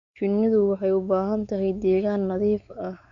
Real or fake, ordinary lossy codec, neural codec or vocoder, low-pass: fake; Opus, 64 kbps; codec, 16 kHz, 4 kbps, X-Codec, WavLM features, trained on Multilingual LibriSpeech; 7.2 kHz